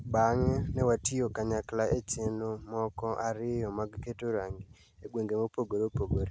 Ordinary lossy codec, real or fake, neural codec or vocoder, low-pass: none; real; none; none